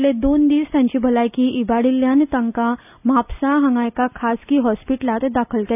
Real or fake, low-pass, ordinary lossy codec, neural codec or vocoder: real; 3.6 kHz; none; none